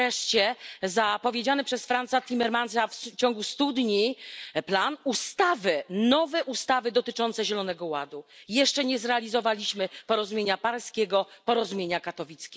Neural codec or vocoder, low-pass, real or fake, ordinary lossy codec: none; none; real; none